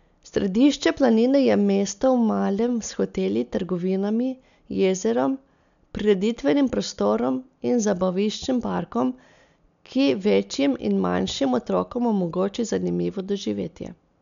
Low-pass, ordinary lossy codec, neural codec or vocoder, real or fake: 7.2 kHz; none; none; real